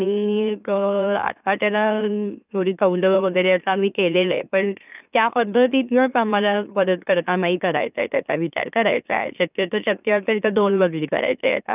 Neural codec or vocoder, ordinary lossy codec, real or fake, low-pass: autoencoder, 44.1 kHz, a latent of 192 numbers a frame, MeloTTS; none; fake; 3.6 kHz